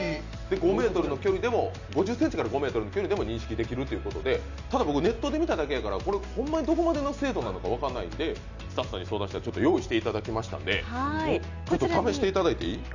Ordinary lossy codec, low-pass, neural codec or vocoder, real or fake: none; 7.2 kHz; none; real